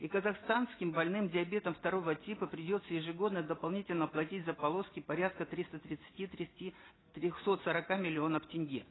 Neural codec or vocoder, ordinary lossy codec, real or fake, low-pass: none; AAC, 16 kbps; real; 7.2 kHz